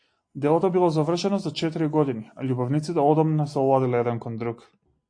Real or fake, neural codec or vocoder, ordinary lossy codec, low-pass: real; none; AAC, 48 kbps; 9.9 kHz